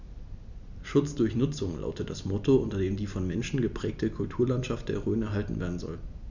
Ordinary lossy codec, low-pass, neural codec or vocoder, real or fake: none; 7.2 kHz; none; real